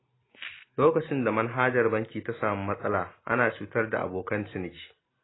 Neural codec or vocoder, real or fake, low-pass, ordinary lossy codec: none; real; 7.2 kHz; AAC, 16 kbps